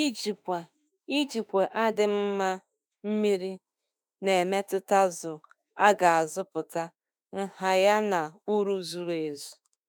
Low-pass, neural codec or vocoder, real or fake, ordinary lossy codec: none; autoencoder, 48 kHz, 32 numbers a frame, DAC-VAE, trained on Japanese speech; fake; none